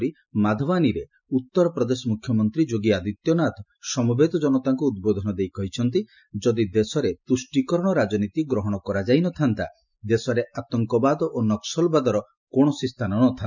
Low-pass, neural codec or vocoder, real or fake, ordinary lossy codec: 7.2 kHz; none; real; none